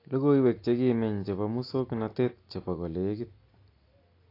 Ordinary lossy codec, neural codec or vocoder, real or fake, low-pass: AAC, 32 kbps; none; real; 5.4 kHz